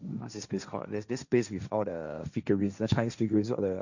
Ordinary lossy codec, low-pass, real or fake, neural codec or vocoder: none; 7.2 kHz; fake; codec, 16 kHz, 1.1 kbps, Voila-Tokenizer